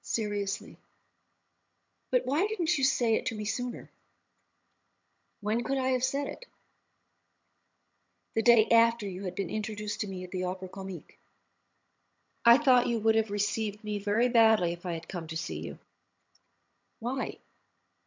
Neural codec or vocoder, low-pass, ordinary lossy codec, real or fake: vocoder, 22.05 kHz, 80 mel bands, HiFi-GAN; 7.2 kHz; MP3, 64 kbps; fake